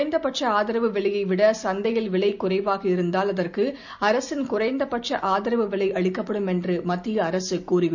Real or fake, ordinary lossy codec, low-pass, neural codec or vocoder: real; none; 7.2 kHz; none